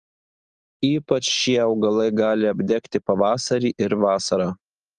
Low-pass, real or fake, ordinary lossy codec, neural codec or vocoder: 9.9 kHz; real; Opus, 24 kbps; none